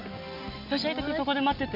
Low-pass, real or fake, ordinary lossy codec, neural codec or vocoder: 5.4 kHz; real; none; none